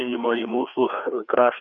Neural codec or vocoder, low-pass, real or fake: codec, 16 kHz, 2 kbps, FreqCodec, larger model; 7.2 kHz; fake